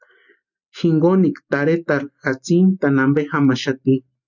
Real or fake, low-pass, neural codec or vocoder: real; 7.2 kHz; none